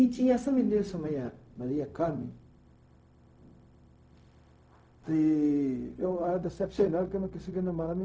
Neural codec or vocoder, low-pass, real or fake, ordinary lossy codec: codec, 16 kHz, 0.4 kbps, LongCat-Audio-Codec; none; fake; none